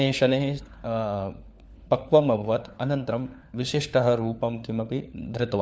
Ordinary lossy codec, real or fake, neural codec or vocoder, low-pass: none; fake; codec, 16 kHz, 4 kbps, FunCodec, trained on LibriTTS, 50 frames a second; none